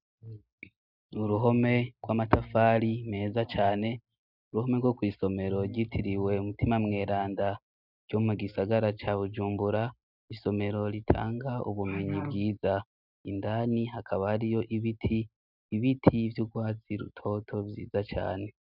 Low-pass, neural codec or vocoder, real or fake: 5.4 kHz; none; real